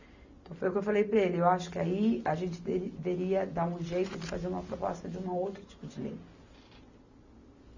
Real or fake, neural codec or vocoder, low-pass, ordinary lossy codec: real; none; 7.2 kHz; none